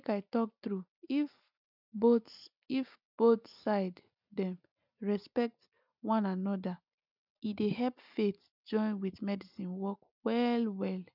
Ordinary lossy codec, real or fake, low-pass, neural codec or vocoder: none; real; 5.4 kHz; none